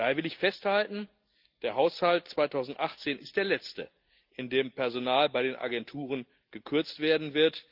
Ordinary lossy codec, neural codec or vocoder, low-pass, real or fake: Opus, 32 kbps; none; 5.4 kHz; real